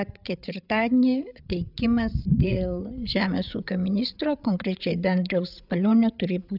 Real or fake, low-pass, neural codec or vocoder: fake; 5.4 kHz; codec, 16 kHz, 8 kbps, FreqCodec, larger model